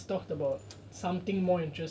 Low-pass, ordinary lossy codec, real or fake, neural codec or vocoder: none; none; real; none